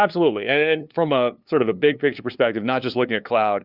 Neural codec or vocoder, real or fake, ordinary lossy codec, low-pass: codec, 16 kHz, 2 kbps, FunCodec, trained on LibriTTS, 25 frames a second; fake; Opus, 64 kbps; 5.4 kHz